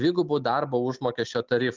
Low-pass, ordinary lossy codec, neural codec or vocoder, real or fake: 7.2 kHz; Opus, 24 kbps; none; real